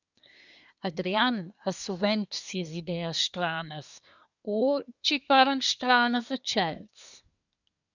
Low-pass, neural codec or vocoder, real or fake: 7.2 kHz; codec, 24 kHz, 1 kbps, SNAC; fake